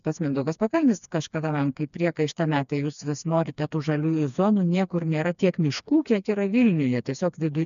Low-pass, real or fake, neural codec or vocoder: 7.2 kHz; fake; codec, 16 kHz, 2 kbps, FreqCodec, smaller model